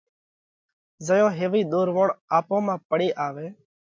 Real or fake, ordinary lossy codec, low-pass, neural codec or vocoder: real; MP3, 64 kbps; 7.2 kHz; none